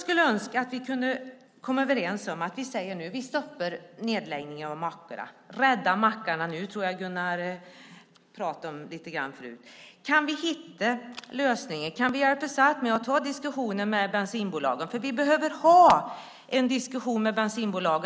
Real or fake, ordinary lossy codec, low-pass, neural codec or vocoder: real; none; none; none